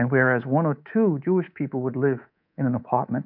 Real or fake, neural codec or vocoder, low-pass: fake; codec, 24 kHz, 3.1 kbps, DualCodec; 5.4 kHz